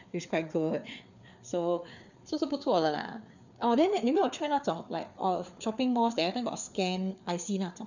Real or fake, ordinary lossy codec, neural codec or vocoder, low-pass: fake; none; codec, 16 kHz, 4 kbps, FreqCodec, larger model; 7.2 kHz